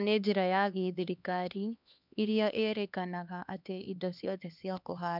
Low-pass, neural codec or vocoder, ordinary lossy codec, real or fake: 5.4 kHz; codec, 16 kHz, 2 kbps, X-Codec, HuBERT features, trained on LibriSpeech; none; fake